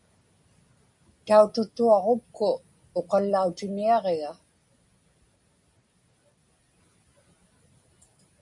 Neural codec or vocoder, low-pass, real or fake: none; 10.8 kHz; real